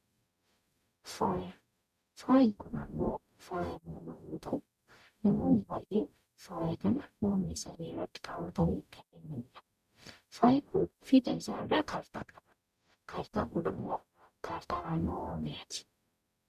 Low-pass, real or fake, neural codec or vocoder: 14.4 kHz; fake; codec, 44.1 kHz, 0.9 kbps, DAC